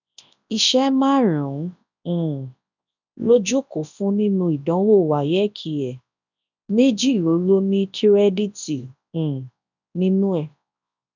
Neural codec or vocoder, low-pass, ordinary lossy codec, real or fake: codec, 24 kHz, 0.9 kbps, WavTokenizer, large speech release; 7.2 kHz; none; fake